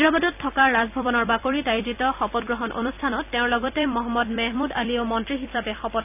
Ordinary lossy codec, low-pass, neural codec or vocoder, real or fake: none; 3.6 kHz; vocoder, 44.1 kHz, 128 mel bands every 256 samples, BigVGAN v2; fake